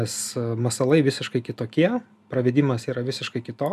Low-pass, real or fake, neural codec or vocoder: 14.4 kHz; real; none